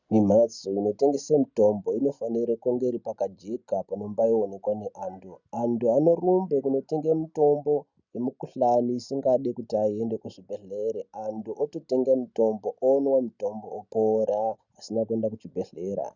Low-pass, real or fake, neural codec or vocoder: 7.2 kHz; real; none